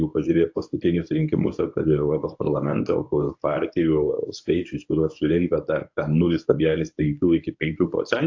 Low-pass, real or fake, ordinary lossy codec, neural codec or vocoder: 7.2 kHz; fake; AAC, 48 kbps; codec, 24 kHz, 0.9 kbps, WavTokenizer, medium speech release version 1